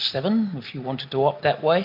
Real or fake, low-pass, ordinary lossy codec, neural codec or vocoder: real; 5.4 kHz; MP3, 48 kbps; none